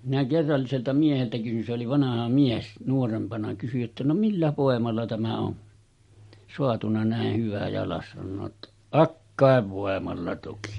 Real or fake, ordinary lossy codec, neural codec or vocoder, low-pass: real; MP3, 48 kbps; none; 19.8 kHz